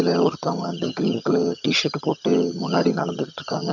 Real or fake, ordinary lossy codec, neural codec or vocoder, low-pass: fake; none; vocoder, 22.05 kHz, 80 mel bands, HiFi-GAN; 7.2 kHz